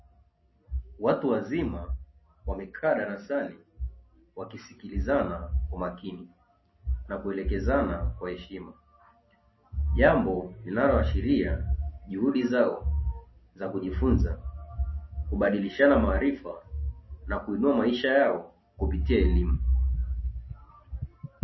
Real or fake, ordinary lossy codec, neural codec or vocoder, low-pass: real; MP3, 24 kbps; none; 7.2 kHz